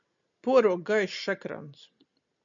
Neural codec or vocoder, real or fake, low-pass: none; real; 7.2 kHz